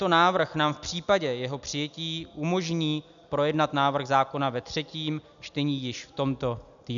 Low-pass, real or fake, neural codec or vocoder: 7.2 kHz; real; none